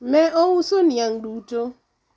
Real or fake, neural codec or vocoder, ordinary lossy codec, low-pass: real; none; none; none